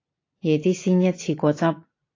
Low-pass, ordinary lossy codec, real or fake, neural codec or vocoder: 7.2 kHz; AAC, 32 kbps; real; none